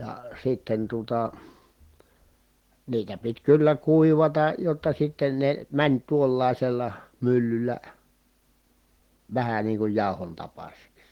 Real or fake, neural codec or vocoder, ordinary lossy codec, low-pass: real; none; Opus, 16 kbps; 19.8 kHz